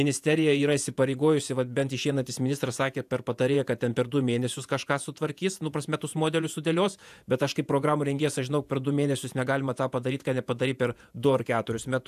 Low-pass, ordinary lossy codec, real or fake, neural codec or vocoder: 14.4 kHz; AAC, 96 kbps; fake; vocoder, 48 kHz, 128 mel bands, Vocos